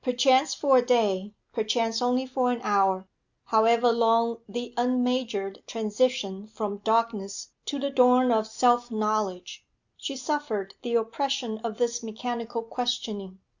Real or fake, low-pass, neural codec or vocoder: real; 7.2 kHz; none